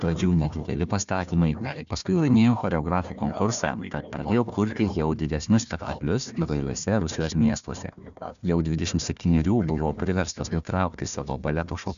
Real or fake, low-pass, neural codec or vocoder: fake; 7.2 kHz; codec, 16 kHz, 1 kbps, FunCodec, trained on Chinese and English, 50 frames a second